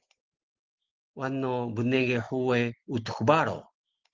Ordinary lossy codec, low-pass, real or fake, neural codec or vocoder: Opus, 16 kbps; 7.2 kHz; real; none